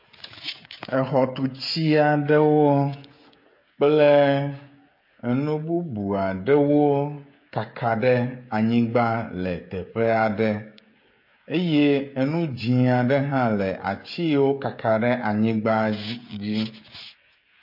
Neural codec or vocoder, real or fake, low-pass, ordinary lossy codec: codec, 16 kHz, 16 kbps, FreqCodec, smaller model; fake; 5.4 kHz; MP3, 32 kbps